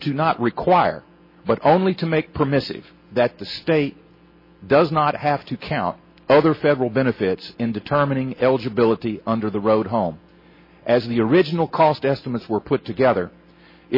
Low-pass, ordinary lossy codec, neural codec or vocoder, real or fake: 5.4 kHz; MP3, 24 kbps; none; real